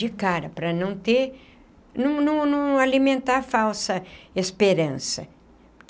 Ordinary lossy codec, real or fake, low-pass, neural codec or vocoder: none; real; none; none